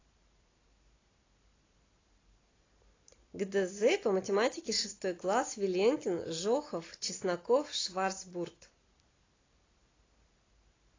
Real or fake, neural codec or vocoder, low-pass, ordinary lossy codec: real; none; 7.2 kHz; AAC, 32 kbps